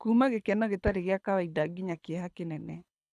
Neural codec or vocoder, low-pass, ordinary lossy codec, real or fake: codec, 24 kHz, 6 kbps, HILCodec; none; none; fake